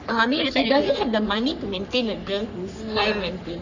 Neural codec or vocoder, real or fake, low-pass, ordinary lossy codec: codec, 44.1 kHz, 3.4 kbps, Pupu-Codec; fake; 7.2 kHz; none